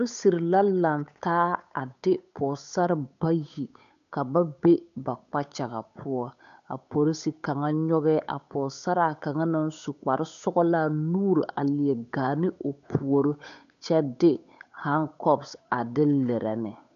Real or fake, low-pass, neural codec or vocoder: fake; 7.2 kHz; codec, 16 kHz, 8 kbps, FunCodec, trained on Chinese and English, 25 frames a second